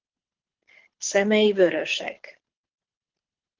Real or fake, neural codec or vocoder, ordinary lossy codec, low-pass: fake; codec, 24 kHz, 6 kbps, HILCodec; Opus, 16 kbps; 7.2 kHz